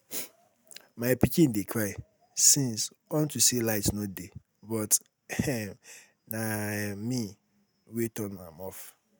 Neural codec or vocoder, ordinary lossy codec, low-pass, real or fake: none; none; none; real